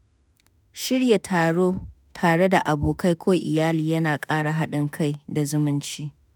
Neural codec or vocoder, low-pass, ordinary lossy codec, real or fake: autoencoder, 48 kHz, 32 numbers a frame, DAC-VAE, trained on Japanese speech; none; none; fake